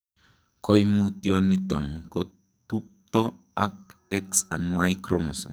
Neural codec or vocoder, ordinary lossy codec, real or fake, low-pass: codec, 44.1 kHz, 2.6 kbps, SNAC; none; fake; none